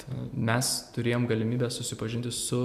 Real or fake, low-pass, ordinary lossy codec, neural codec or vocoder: fake; 14.4 kHz; MP3, 96 kbps; vocoder, 44.1 kHz, 128 mel bands every 256 samples, BigVGAN v2